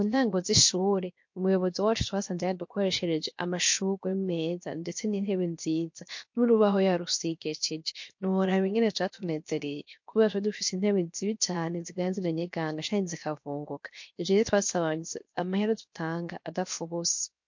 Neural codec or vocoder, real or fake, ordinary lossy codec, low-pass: codec, 16 kHz, 0.7 kbps, FocalCodec; fake; MP3, 48 kbps; 7.2 kHz